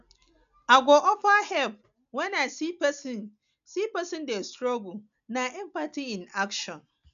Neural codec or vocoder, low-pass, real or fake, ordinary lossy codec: none; 7.2 kHz; real; none